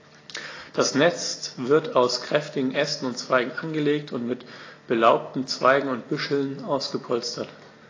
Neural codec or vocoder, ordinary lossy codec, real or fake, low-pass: none; AAC, 32 kbps; real; 7.2 kHz